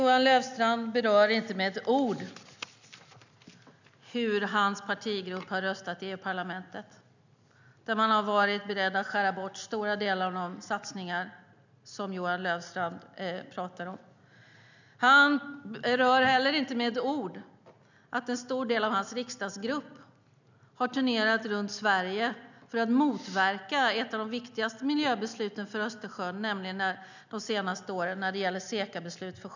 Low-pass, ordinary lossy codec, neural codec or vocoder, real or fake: 7.2 kHz; none; none; real